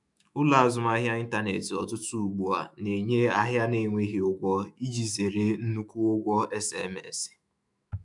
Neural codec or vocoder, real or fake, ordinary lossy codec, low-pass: autoencoder, 48 kHz, 128 numbers a frame, DAC-VAE, trained on Japanese speech; fake; none; 10.8 kHz